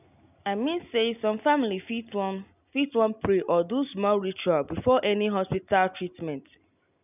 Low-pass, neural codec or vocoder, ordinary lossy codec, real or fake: 3.6 kHz; none; none; real